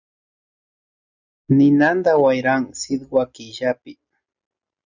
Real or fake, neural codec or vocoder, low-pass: real; none; 7.2 kHz